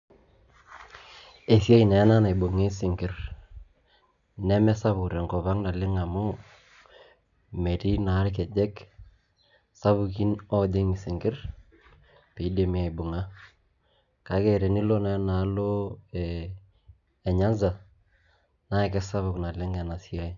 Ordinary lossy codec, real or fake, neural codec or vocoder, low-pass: none; real; none; 7.2 kHz